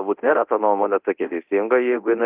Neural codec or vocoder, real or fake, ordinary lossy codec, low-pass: codec, 24 kHz, 0.9 kbps, DualCodec; fake; Opus, 24 kbps; 3.6 kHz